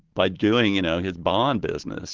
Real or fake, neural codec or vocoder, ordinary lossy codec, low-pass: real; none; Opus, 16 kbps; 7.2 kHz